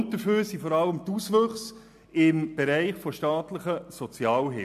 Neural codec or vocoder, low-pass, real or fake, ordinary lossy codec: none; 14.4 kHz; real; MP3, 96 kbps